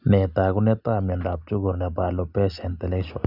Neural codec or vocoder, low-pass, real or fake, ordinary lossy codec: none; 5.4 kHz; real; none